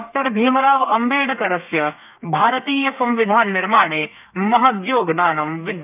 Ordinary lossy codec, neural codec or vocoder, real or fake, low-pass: none; codec, 32 kHz, 1.9 kbps, SNAC; fake; 3.6 kHz